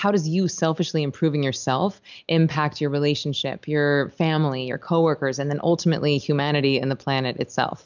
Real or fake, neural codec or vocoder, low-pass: real; none; 7.2 kHz